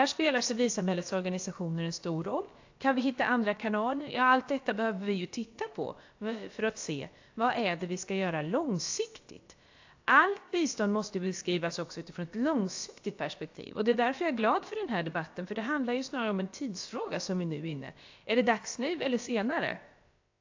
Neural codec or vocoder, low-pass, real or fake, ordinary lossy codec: codec, 16 kHz, about 1 kbps, DyCAST, with the encoder's durations; 7.2 kHz; fake; AAC, 48 kbps